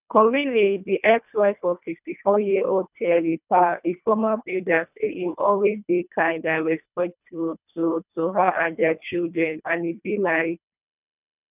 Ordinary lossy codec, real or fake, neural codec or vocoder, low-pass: none; fake; codec, 24 kHz, 1.5 kbps, HILCodec; 3.6 kHz